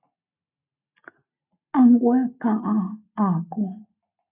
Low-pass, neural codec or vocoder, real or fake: 3.6 kHz; none; real